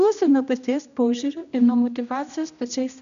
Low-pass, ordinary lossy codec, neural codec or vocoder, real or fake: 7.2 kHz; MP3, 96 kbps; codec, 16 kHz, 1 kbps, X-Codec, HuBERT features, trained on general audio; fake